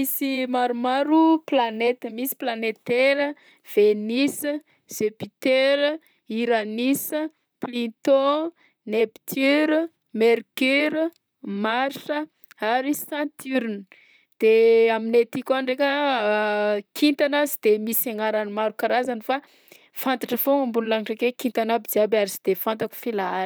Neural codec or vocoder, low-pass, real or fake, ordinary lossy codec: vocoder, 44.1 kHz, 128 mel bands, Pupu-Vocoder; none; fake; none